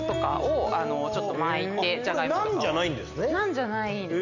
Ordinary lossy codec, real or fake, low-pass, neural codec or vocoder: none; real; 7.2 kHz; none